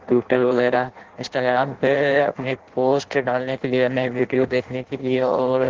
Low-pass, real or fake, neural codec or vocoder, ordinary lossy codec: 7.2 kHz; fake; codec, 16 kHz in and 24 kHz out, 0.6 kbps, FireRedTTS-2 codec; Opus, 16 kbps